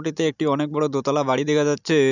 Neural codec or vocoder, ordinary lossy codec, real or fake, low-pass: none; none; real; 7.2 kHz